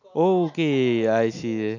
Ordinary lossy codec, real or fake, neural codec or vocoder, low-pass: none; real; none; 7.2 kHz